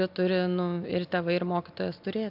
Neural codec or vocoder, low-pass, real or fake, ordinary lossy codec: none; 5.4 kHz; real; AAC, 48 kbps